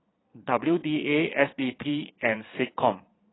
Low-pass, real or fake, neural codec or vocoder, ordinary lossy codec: 7.2 kHz; fake; vocoder, 22.05 kHz, 80 mel bands, Vocos; AAC, 16 kbps